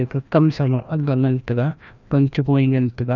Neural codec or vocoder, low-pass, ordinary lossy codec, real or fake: codec, 16 kHz, 1 kbps, FreqCodec, larger model; 7.2 kHz; none; fake